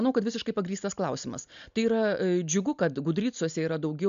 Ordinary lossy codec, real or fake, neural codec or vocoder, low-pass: MP3, 96 kbps; real; none; 7.2 kHz